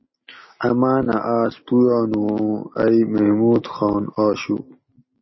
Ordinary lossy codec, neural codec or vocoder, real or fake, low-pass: MP3, 24 kbps; none; real; 7.2 kHz